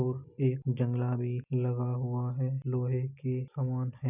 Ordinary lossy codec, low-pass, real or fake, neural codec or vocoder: none; 3.6 kHz; real; none